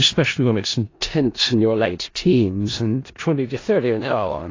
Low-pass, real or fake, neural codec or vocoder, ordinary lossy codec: 7.2 kHz; fake; codec, 16 kHz in and 24 kHz out, 0.4 kbps, LongCat-Audio-Codec, four codebook decoder; AAC, 32 kbps